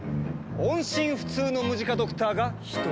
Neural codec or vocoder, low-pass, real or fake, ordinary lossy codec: none; none; real; none